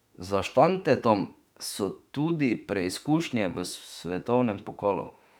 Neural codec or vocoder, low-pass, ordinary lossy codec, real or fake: autoencoder, 48 kHz, 32 numbers a frame, DAC-VAE, trained on Japanese speech; 19.8 kHz; none; fake